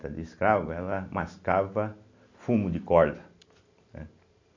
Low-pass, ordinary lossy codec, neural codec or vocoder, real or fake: 7.2 kHz; MP3, 64 kbps; none; real